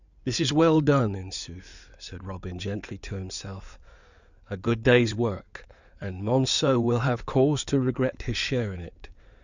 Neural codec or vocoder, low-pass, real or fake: codec, 16 kHz in and 24 kHz out, 2.2 kbps, FireRedTTS-2 codec; 7.2 kHz; fake